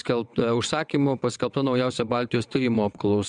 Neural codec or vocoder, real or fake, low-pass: vocoder, 22.05 kHz, 80 mel bands, WaveNeXt; fake; 9.9 kHz